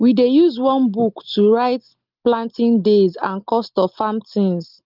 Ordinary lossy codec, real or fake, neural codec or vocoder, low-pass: Opus, 32 kbps; real; none; 5.4 kHz